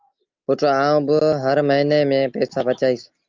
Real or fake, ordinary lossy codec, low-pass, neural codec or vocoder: real; Opus, 24 kbps; 7.2 kHz; none